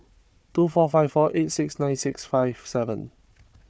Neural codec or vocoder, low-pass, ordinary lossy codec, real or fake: codec, 16 kHz, 4 kbps, FunCodec, trained on Chinese and English, 50 frames a second; none; none; fake